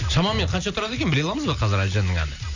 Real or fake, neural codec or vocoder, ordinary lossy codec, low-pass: real; none; none; 7.2 kHz